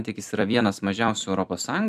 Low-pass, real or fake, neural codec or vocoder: 14.4 kHz; fake; vocoder, 44.1 kHz, 128 mel bands every 256 samples, BigVGAN v2